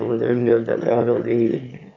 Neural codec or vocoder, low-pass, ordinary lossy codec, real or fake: autoencoder, 22.05 kHz, a latent of 192 numbers a frame, VITS, trained on one speaker; 7.2 kHz; none; fake